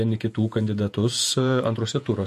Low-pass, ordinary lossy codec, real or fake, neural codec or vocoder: 14.4 kHz; MP3, 64 kbps; fake; vocoder, 48 kHz, 128 mel bands, Vocos